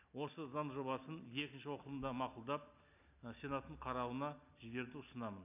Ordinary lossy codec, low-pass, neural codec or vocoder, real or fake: none; 3.6 kHz; none; real